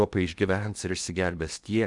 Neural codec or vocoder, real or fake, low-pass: codec, 16 kHz in and 24 kHz out, 0.8 kbps, FocalCodec, streaming, 65536 codes; fake; 10.8 kHz